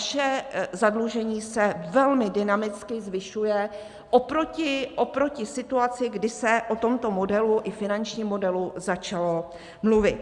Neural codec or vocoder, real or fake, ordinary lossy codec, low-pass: none; real; Opus, 64 kbps; 10.8 kHz